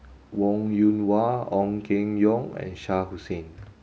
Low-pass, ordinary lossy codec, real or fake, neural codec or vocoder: none; none; real; none